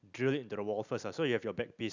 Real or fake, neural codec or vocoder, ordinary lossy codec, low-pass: real; none; none; 7.2 kHz